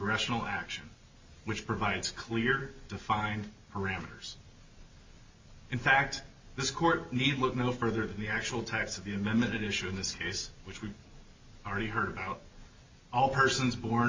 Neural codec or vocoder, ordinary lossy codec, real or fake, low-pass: none; MP3, 64 kbps; real; 7.2 kHz